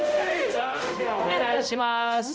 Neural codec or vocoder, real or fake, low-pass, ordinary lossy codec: codec, 16 kHz, 0.5 kbps, X-Codec, HuBERT features, trained on balanced general audio; fake; none; none